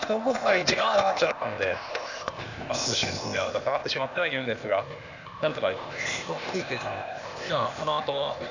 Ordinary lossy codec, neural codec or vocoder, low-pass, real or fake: none; codec, 16 kHz, 0.8 kbps, ZipCodec; 7.2 kHz; fake